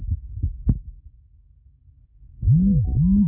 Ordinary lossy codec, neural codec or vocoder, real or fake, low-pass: none; none; real; 3.6 kHz